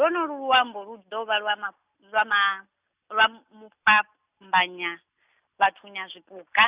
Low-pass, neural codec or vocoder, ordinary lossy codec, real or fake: 3.6 kHz; none; Opus, 64 kbps; real